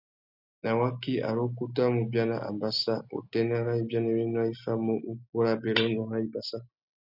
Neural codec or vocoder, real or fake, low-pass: none; real; 5.4 kHz